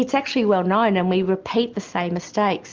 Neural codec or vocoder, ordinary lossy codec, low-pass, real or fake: none; Opus, 32 kbps; 7.2 kHz; real